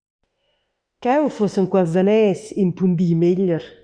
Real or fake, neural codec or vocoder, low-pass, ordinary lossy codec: fake; autoencoder, 48 kHz, 32 numbers a frame, DAC-VAE, trained on Japanese speech; 9.9 kHz; Opus, 64 kbps